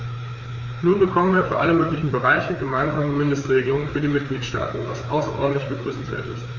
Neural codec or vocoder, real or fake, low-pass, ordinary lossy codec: codec, 16 kHz, 4 kbps, FreqCodec, larger model; fake; 7.2 kHz; Opus, 64 kbps